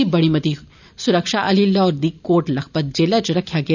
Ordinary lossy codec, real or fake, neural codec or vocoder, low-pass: none; real; none; 7.2 kHz